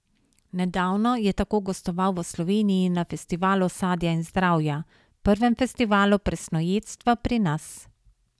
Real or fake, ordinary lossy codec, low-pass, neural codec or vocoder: real; none; none; none